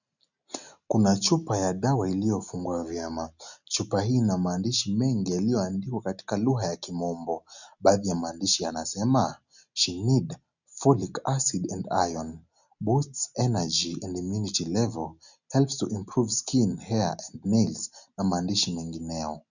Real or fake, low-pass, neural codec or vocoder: real; 7.2 kHz; none